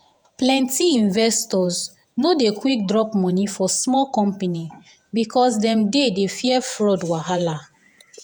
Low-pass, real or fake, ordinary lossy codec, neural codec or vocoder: none; fake; none; vocoder, 48 kHz, 128 mel bands, Vocos